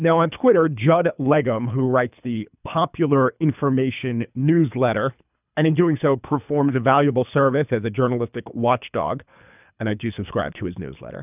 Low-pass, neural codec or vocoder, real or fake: 3.6 kHz; codec, 24 kHz, 3 kbps, HILCodec; fake